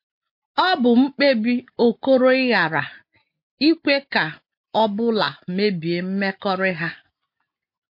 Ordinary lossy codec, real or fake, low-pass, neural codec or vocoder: MP3, 32 kbps; real; 5.4 kHz; none